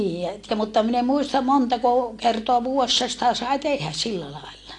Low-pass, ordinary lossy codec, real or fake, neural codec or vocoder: 10.8 kHz; AAC, 48 kbps; real; none